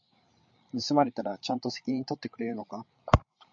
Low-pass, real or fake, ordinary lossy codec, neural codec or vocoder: 7.2 kHz; fake; MP3, 48 kbps; codec, 16 kHz, 8 kbps, FreqCodec, larger model